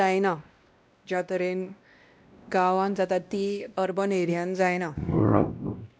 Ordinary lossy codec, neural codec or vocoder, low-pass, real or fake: none; codec, 16 kHz, 1 kbps, X-Codec, WavLM features, trained on Multilingual LibriSpeech; none; fake